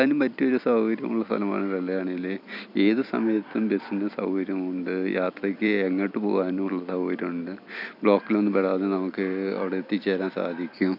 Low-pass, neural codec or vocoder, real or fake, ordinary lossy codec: 5.4 kHz; vocoder, 44.1 kHz, 128 mel bands every 256 samples, BigVGAN v2; fake; none